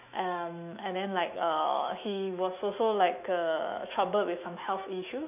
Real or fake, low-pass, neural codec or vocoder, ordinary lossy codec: fake; 3.6 kHz; autoencoder, 48 kHz, 128 numbers a frame, DAC-VAE, trained on Japanese speech; none